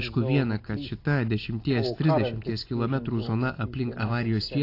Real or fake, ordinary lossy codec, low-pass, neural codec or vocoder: real; AAC, 32 kbps; 5.4 kHz; none